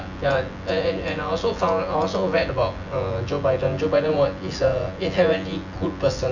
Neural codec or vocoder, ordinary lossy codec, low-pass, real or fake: vocoder, 24 kHz, 100 mel bands, Vocos; none; 7.2 kHz; fake